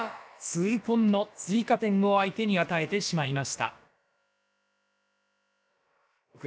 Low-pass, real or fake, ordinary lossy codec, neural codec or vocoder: none; fake; none; codec, 16 kHz, about 1 kbps, DyCAST, with the encoder's durations